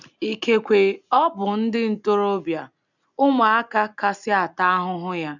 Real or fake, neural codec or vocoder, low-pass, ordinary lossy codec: real; none; 7.2 kHz; none